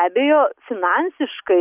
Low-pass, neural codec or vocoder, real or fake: 3.6 kHz; none; real